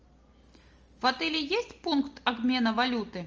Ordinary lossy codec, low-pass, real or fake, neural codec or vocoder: Opus, 24 kbps; 7.2 kHz; real; none